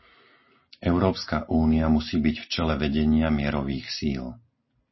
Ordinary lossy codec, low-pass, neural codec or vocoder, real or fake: MP3, 24 kbps; 7.2 kHz; none; real